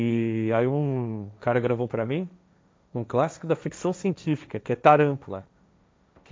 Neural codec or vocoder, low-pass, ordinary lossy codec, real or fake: codec, 16 kHz, 1.1 kbps, Voila-Tokenizer; none; none; fake